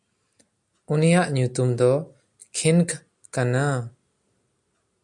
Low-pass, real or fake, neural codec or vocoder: 10.8 kHz; real; none